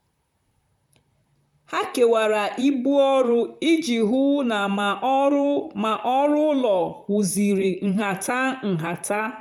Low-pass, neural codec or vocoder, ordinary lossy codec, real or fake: 19.8 kHz; vocoder, 44.1 kHz, 128 mel bands, Pupu-Vocoder; none; fake